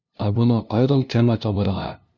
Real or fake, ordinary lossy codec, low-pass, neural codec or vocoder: fake; Opus, 64 kbps; 7.2 kHz; codec, 16 kHz, 0.5 kbps, FunCodec, trained on LibriTTS, 25 frames a second